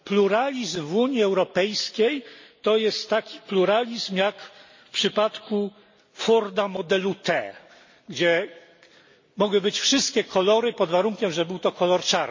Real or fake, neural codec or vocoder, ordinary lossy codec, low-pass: real; none; MP3, 32 kbps; 7.2 kHz